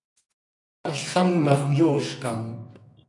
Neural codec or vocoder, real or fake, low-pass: codec, 24 kHz, 0.9 kbps, WavTokenizer, medium music audio release; fake; 10.8 kHz